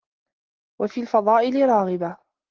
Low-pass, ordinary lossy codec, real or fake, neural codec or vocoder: 7.2 kHz; Opus, 16 kbps; real; none